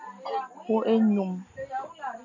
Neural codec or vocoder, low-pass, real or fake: none; 7.2 kHz; real